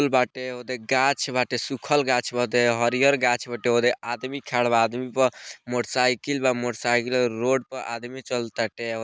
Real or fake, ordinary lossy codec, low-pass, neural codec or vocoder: real; none; none; none